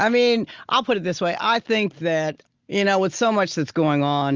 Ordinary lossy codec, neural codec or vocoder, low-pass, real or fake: Opus, 32 kbps; none; 7.2 kHz; real